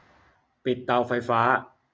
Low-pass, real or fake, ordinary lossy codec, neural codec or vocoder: none; real; none; none